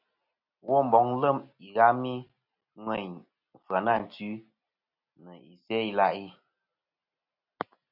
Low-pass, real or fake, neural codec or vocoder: 5.4 kHz; real; none